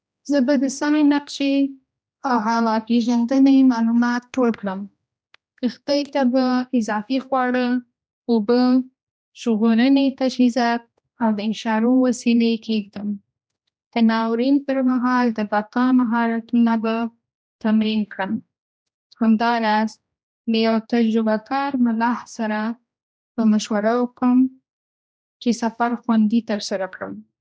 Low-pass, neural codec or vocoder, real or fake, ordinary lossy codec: none; codec, 16 kHz, 1 kbps, X-Codec, HuBERT features, trained on general audio; fake; none